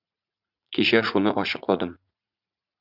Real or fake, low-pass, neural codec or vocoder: fake; 5.4 kHz; vocoder, 44.1 kHz, 80 mel bands, Vocos